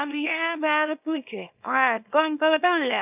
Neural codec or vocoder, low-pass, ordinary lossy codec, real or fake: codec, 24 kHz, 0.9 kbps, WavTokenizer, small release; 3.6 kHz; none; fake